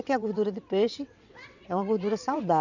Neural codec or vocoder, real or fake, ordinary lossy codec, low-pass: vocoder, 22.05 kHz, 80 mel bands, WaveNeXt; fake; none; 7.2 kHz